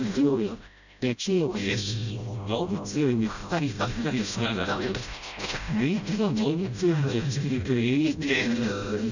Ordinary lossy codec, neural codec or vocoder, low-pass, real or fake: none; codec, 16 kHz, 0.5 kbps, FreqCodec, smaller model; 7.2 kHz; fake